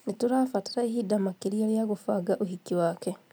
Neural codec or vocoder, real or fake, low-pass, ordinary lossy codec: none; real; none; none